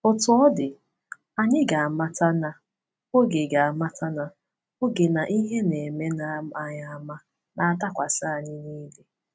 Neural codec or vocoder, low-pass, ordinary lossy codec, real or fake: none; none; none; real